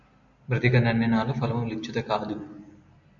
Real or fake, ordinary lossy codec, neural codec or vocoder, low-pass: real; MP3, 64 kbps; none; 7.2 kHz